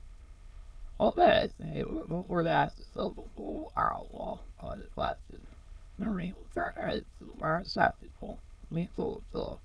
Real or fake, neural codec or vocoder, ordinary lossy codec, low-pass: fake; autoencoder, 22.05 kHz, a latent of 192 numbers a frame, VITS, trained on many speakers; none; none